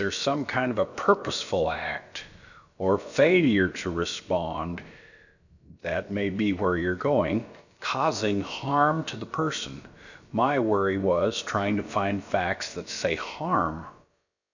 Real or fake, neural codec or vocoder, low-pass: fake; codec, 16 kHz, about 1 kbps, DyCAST, with the encoder's durations; 7.2 kHz